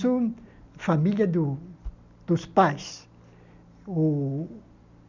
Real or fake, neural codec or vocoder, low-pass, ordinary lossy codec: real; none; 7.2 kHz; none